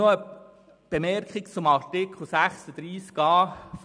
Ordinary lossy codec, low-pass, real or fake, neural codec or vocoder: none; 9.9 kHz; real; none